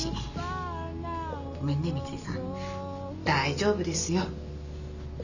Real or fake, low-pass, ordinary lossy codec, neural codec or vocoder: real; 7.2 kHz; none; none